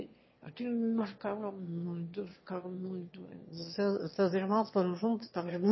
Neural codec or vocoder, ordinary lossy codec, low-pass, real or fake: autoencoder, 22.05 kHz, a latent of 192 numbers a frame, VITS, trained on one speaker; MP3, 24 kbps; 7.2 kHz; fake